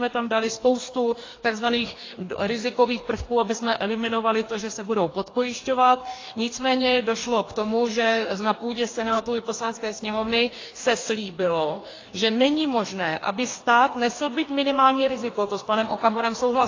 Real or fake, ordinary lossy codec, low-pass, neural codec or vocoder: fake; AAC, 32 kbps; 7.2 kHz; codec, 44.1 kHz, 2.6 kbps, DAC